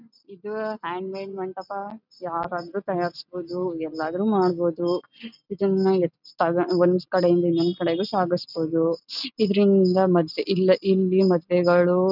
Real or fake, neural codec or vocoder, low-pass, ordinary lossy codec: real; none; 5.4 kHz; none